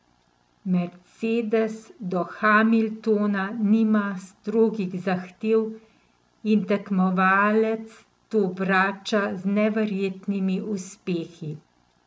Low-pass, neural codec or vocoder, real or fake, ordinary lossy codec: none; none; real; none